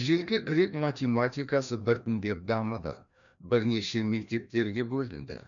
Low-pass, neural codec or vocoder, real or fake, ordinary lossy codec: 7.2 kHz; codec, 16 kHz, 1 kbps, FreqCodec, larger model; fake; none